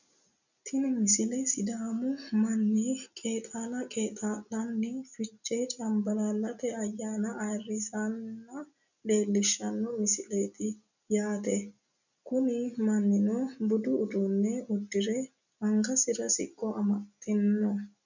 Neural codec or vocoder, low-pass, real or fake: none; 7.2 kHz; real